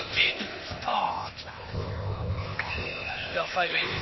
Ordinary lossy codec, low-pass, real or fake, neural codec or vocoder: MP3, 24 kbps; 7.2 kHz; fake; codec, 16 kHz, 0.8 kbps, ZipCodec